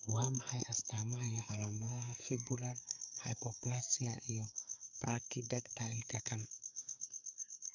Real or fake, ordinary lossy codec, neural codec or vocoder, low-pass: fake; none; codec, 44.1 kHz, 2.6 kbps, SNAC; 7.2 kHz